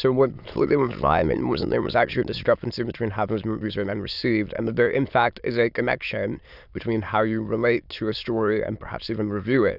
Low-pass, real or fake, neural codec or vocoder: 5.4 kHz; fake; autoencoder, 22.05 kHz, a latent of 192 numbers a frame, VITS, trained on many speakers